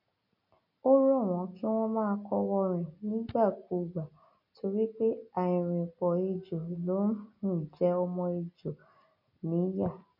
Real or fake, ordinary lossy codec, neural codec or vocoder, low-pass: real; MP3, 32 kbps; none; 5.4 kHz